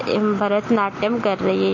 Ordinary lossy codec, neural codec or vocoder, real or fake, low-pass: MP3, 32 kbps; none; real; 7.2 kHz